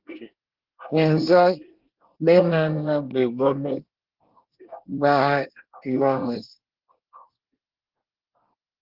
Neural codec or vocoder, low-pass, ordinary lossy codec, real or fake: codec, 24 kHz, 1 kbps, SNAC; 5.4 kHz; Opus, 16 kbps; fake